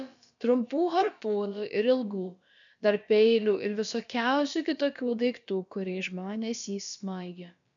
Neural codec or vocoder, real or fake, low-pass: codec, 16 kHz, about 1 kbps, DyCAST, with the encoder's durations; fake; 7.2 kHz